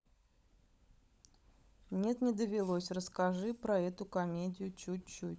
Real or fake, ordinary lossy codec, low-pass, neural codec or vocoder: fake; none; none; codec, 16 kHz, 16 kbps, FunCodec, trained on LibriTTS, 50 frames a second